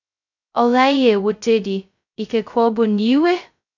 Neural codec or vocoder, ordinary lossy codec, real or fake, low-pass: codec, 16 kHz, 0.2 kbps, FocalCodec; none; fake; 7.2 kHz